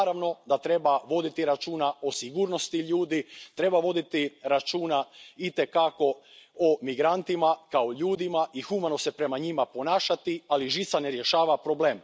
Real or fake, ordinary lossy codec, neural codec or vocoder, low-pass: real; none; none; none